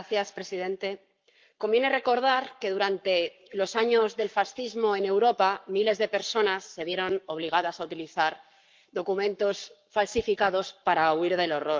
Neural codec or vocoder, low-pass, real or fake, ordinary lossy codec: codec, 44.1 kHz, 7.8 kbps, Pupu-Codec; 7.2 kHz; fake; Opus, 24 kbps